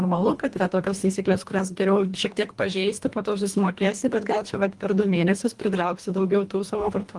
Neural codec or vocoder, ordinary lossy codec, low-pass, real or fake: codec, 24 kHz, 1.5 kbps, HILCodec; Opus, 24 kbps; 10.8 kHz; fake